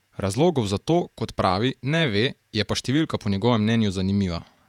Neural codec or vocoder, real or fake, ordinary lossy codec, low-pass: vocoder, 48 kHz, 128 mel bands, Vocos; fake; none; 19.8 kHz